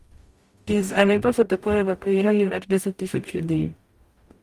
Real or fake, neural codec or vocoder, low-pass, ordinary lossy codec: fake; codec, 44.1 kHz, 0.9 kbps, DAC; 14.4 kHz; Opus, 32 kbps